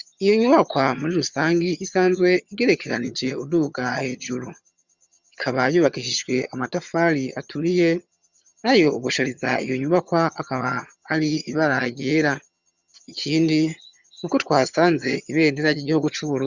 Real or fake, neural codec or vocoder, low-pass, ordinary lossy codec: fake; vocoder, 22.05 kHz, 80 mel bands, HiFi-GAN; 7.2 kHz; Opus, 64 kbps